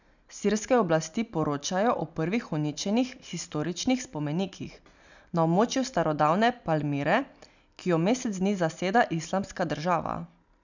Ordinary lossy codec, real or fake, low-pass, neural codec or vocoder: none; real; 7.2 kHz; none